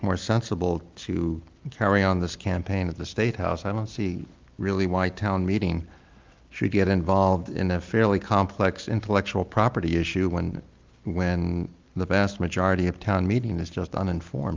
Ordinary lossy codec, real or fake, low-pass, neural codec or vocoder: Opus, 32 kbps; real; 7.2 kHz; none